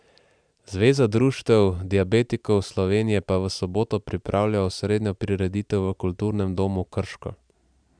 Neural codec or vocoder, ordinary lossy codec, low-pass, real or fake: vocoder, 44.1 kHz, 128 mel bands every 512 samples, BigVGAN v2; none; 9.9 kHz; fake